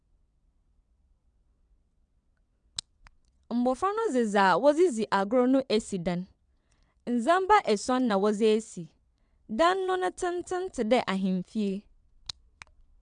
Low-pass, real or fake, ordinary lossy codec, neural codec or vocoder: 9.9 kHz; fake; none; vocoder, 22.05 kHz, 80 mel bands, WaveNeXt